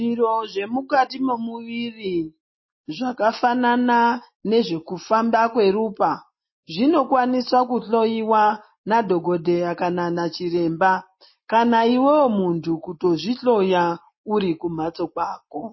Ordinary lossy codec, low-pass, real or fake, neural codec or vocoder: MP3, 24 kbps; 7.2 kHz; real; none